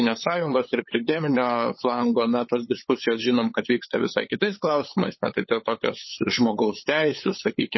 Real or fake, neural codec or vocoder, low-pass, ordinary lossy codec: fake; codec, 16 kHz, 8 kbps, FunCodec, trained on LibriTTS, 25 frames a second; 7.2 kHz; MP3, 24 kbps